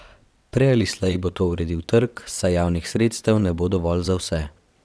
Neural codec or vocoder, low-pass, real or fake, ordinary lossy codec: vocoder, 22.05 kHz, 80 mel bands, WaveNeXt; none; fake; none